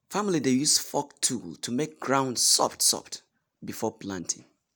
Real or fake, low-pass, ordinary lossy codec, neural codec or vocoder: real; none; none; none